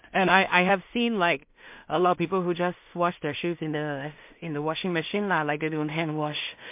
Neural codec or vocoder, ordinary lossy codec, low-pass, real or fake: codec, 16 kHz in and 24 kHz out, 0.4 kbps, LongCat-Audio-Codec, two codebook decoder; MP3, 32 kbps; 3.6 kHz; fake